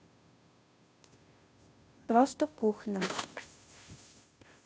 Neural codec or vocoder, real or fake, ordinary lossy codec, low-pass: codec, 16 kHz, 0.5 kbps, FunCodec, trained on Chinese and English, 25 frames a second; fake; none; none